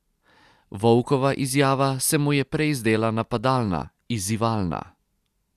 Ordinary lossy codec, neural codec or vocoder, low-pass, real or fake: Opus, 64 kbps; none; 14.4 kHz; real